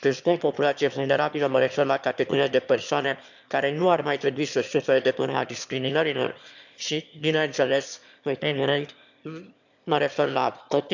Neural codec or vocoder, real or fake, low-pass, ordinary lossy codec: autoencoder, 22.05 kHz, a latent of 192 numbers a frame, VITS, trained on one speaker; fake; 7.2 kHz; none